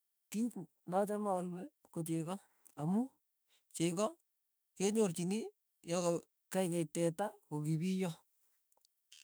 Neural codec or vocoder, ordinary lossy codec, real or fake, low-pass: autoencoder, 48 kHz, 32 numbers a frame, DAC-VAE, trained on Japanese speech; none; fake; none